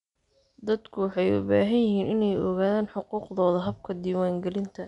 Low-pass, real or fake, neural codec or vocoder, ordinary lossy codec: 10.8 kHz; real; none; none